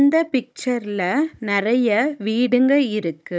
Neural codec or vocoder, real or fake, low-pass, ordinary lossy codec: codec, 16 kHz, 16 kbps, FunCodec, trained on Chinese and English, 50 frames a second; fake; none; none